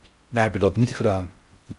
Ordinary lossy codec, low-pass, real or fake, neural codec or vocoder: MP3, 96 kbps; 10.8 kHz; fake; codec, 16 kHz in and 24 kHz out, 0.6 kbps, FocalCodec, streaming, 4096 codes